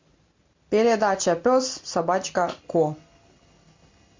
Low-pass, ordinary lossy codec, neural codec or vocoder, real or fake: 7.2 kHz; MP3, 48 kbps; none; real